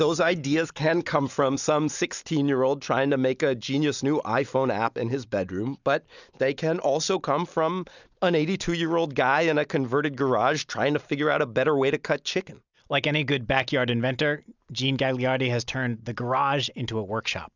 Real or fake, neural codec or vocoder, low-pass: real; none; 7.2 kHz